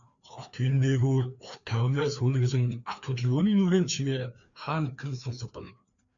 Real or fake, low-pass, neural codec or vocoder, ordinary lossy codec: fake; 7.2 kHz; codec, 16 kHz, 2 kbps, FreqCodec, larger model; MP3, 96 kbps